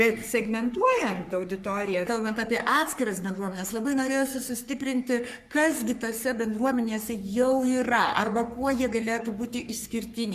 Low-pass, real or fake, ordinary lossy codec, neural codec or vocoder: 14.4 kHz; fake; MP3, 96 kbps; codec, 44.1 kHz, 3.4 kbps, Pupu-Codec